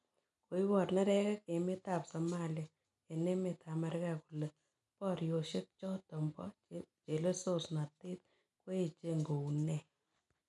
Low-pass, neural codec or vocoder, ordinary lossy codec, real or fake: 10.8 kHz; none; none; real